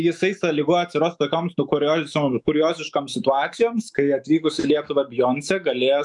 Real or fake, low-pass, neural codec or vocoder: fake; 10.8 kHz; autoencoder, 48 kHz, 128 numbers a frame, DAC-VAE, trained on Japanese speech